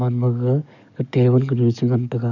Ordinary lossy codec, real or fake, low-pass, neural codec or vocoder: none; fake; 7.2 kHz; codec, 44.1 kHz, 7.8 kbps, DAC